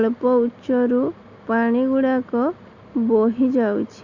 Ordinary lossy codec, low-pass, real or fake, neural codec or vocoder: Opus, 64 kbps; 7.2 kHz; real; none